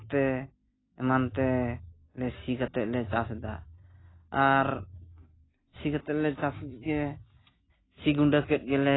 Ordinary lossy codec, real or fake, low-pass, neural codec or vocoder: AAC, 16 kbps; real; 7.2 kHz; none